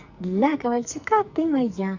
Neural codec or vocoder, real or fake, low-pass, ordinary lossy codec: codec, 44.1 kHz, 2.6 kbps, SNAC; fake; 7.2 kHz; Opus, 64 kbps